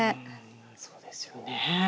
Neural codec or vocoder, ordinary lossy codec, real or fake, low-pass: none; none; real; none